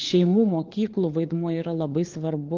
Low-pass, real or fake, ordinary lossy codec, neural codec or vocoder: 7.2 kHz; fake; Opus, 16 kbps; codec, 16 kHz, 4 kbps, FunCodec, trained on LibriTTS, 50 frames a second